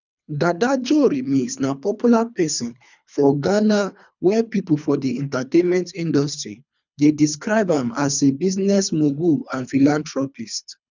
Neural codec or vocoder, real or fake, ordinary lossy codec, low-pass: codec, 24 kHz, 3 kbps, HILCodec; fake; none; 7.2 kHz